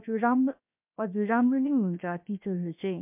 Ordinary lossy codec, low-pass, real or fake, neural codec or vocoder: MP3, 32 kbps; 3.6 kHz; fake; codec, 16 kHz, 0.7 kbps, FocalCodec